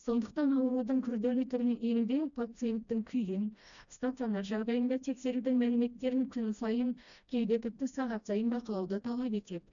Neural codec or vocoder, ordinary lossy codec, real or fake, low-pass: codec, 16 kHz, 1 kbps, FreqCodec, smaller model; none; fake; 7.2 kHz